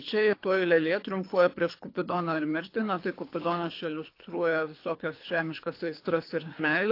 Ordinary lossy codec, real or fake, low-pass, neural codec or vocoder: AAC, 32 kbps; fake; 5.4 kHz; codec, 24 kHz, 3 kbps, HILCodec